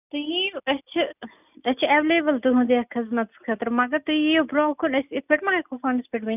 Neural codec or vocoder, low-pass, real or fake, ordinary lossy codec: none; 3.6 kHz; real; none